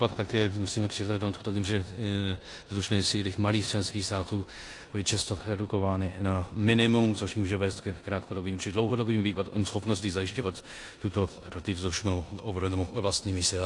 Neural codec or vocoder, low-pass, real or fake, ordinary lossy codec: codec, 16 kHz in and 24 kHz out, 0.9 kbps, LongCat-Audio-Codec, four codebook decoder; 10.8 kHz; fake; AAC, 48 kbps